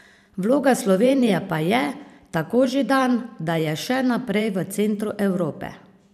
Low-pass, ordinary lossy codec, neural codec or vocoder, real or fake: 14.4 kHz; none; vocoder, 44.1 kHz, 128 mel bands every 512 samples, BigVGAN v2; fake